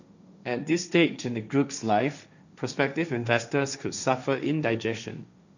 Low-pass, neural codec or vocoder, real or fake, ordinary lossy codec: 7.2 kHz; codec, 16 kHz, 1.1 kbps, Voila-Tokenizer; fake; none